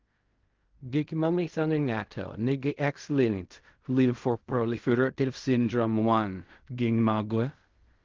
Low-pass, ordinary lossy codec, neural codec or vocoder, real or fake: 7.2 kHz; Opus, 32 kbps; codec, 16 kHz in and 24 kHz out, 0.4 kbps, LongCat-Audio-Codec, fine tuned four codebook decoder; fake